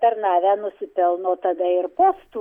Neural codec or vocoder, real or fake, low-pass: none; real; 19.8 kHz